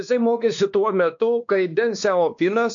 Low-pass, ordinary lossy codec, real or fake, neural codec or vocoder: 7.2 kHz; AAC, 64 kbps; fake; codec, 16 kHz, 2 kbps, X-Codec, WavLM features, trained on Multilingual LibriSpeech